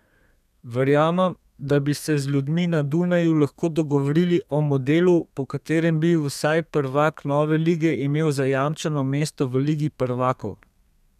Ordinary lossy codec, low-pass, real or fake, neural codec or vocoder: none; 14.4 kHz; fake; codec, 32 kHz, 1.9 kbps, SNAC